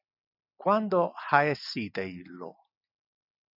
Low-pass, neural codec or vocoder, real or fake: 5.4 kHz; none; real